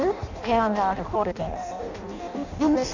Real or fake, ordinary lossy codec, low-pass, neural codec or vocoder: fake; none; 7.2 kHz; codec, 16 kHz in and 24 kHz out, 0.6 kbps, FireRedTTS-2 codec